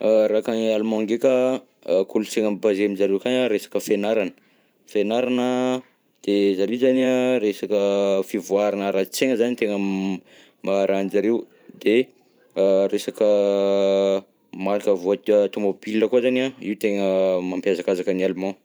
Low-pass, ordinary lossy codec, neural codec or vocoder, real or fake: none; none; vocoder, 44.1 kHz, 128 mel bands every 512 samples, BigVGAN v2; fake